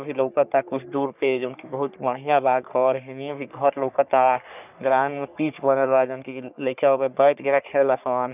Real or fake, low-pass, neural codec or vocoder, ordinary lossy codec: fake; 3.6 kHz; codec, 44.1 kHz, 3.4 kbps, Pupu-Codec; none